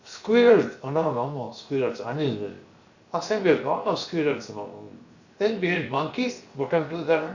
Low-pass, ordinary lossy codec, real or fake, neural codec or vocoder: 7.2 kHz; Opus, 64 kbps; fake; codec, 16 kHz, 0.7 kbps, FocalCodec